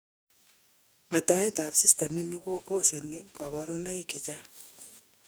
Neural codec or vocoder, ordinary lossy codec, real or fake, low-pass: codec, 44.1 kHz, 2.6 kbps, DAC; none; fake; none